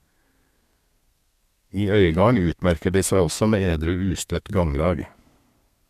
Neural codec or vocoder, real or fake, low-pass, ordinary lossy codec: codec, 32 kHz, 1.9 kbps, SNAC; fake; 14.4 kHz; none